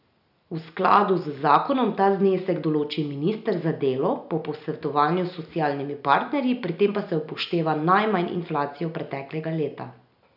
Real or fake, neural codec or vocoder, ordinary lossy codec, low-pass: real; none; none; 5.4 kHz